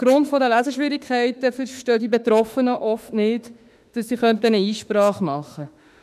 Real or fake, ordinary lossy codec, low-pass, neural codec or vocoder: fake; none; 14.4 kHz; autoencoder, 48 kHz, 32 numbers a frame, DAC-VAE, trained on Japanese speech